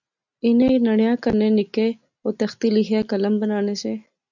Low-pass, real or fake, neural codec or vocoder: 7.2 kHz; real; none